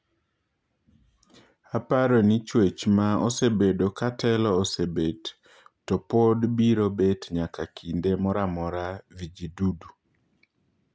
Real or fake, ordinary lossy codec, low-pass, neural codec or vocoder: real; none; none; none